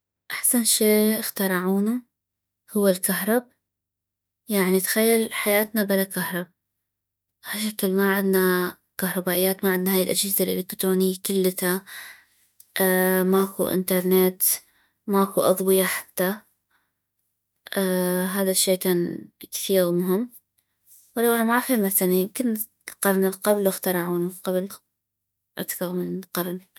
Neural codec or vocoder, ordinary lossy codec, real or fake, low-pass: autoencoder, 48 kHz, 32 numbers a frame, DAC-VAE, trained on Japanese speech; none; fake; none